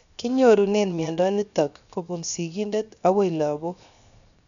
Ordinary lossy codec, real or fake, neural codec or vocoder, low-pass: none; fake; codec, 16 kHz, 0.7 kbps, FocalCodec; 7.2 kHz